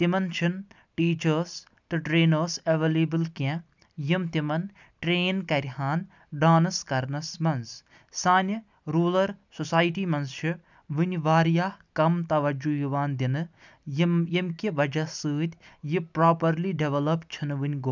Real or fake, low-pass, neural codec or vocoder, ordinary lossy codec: real; 7.2 kHz; none; none